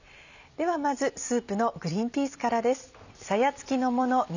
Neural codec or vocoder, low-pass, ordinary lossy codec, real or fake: vocoder, 44.1 kHz, 128 mel bands every 256 samples, BigVGAN v2; 7.2 kHz; none; fake